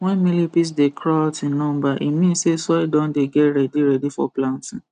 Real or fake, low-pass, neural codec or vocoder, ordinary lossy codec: real; 9.9 kHz; none; none